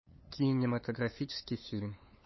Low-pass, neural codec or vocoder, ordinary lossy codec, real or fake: 7.2 kHz; codec, 16 kHz, 8 kbps, FunCodec, trained on LibriTTS, 25 frames a second; MP3, 24 kbps; fake